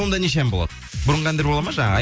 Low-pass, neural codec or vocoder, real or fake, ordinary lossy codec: none; none; real; none